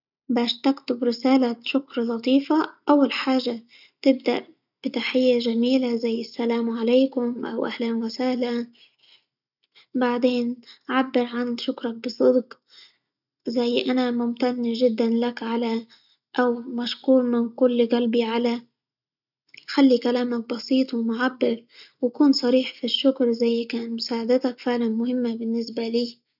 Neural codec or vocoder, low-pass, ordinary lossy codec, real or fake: none; 5.4 kHz; none; real